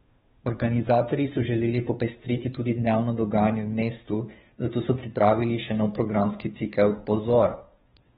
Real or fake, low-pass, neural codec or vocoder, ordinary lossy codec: fake; 7.2 kHz; codec, 16 kHz, 2 kbps, FunCodec, trained on Chinese and English, 25 frames a second; AAC, 16 kbps